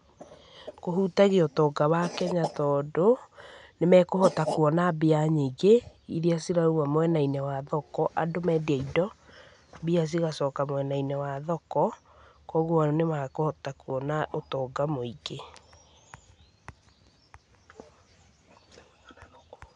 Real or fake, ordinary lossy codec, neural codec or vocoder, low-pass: real; none; none; 9.9 kHz